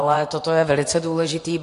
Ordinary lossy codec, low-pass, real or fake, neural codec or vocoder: AAC, 48 kbps; 10.8 kHz; fake; vocoder, 24 kHz, 100 mel bands, Vocos